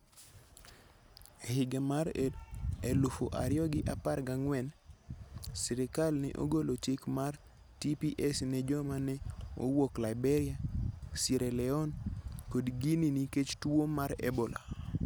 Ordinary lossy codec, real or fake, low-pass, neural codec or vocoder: none; real; none; none